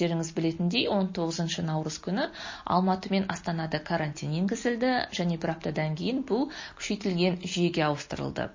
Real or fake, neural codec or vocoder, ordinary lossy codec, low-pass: real; none; MP3, 32 kbps; 7.2 kHz